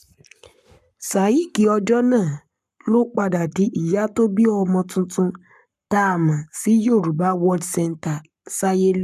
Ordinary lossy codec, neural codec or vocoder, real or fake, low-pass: none; codec, 44.1 kHz, 7.8 kbps, Pupu-Codec; fake; 14.4 kHz